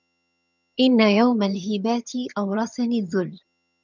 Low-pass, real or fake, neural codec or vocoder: 7.2 kHz; fake; vocoder, 22.05 kHz, 80 mel bands, HiFi-GAN